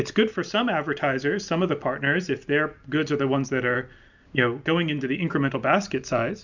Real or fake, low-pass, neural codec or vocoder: real; 7.2 kHz; none